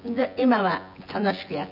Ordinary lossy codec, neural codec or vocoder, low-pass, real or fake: none; vocoder, 24 kHz, 100 mel bands, Vocos; 5.4 kHz; fake